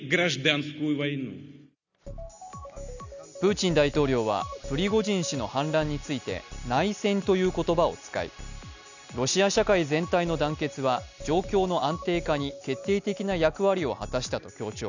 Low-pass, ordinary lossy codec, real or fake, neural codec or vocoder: 7.2 kHz; none; real; none